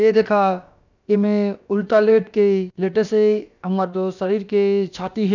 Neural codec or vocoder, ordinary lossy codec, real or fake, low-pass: codec, 16 kHz, about 1 kbps, DyCAST, with the encoder's durations; none; fake; 7.2 kHz